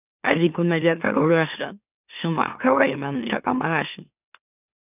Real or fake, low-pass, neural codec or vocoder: fake; 3.6 kHz; autoencoder, 44.1 kHz, a latent of 192 numbers a frame, MeloTTS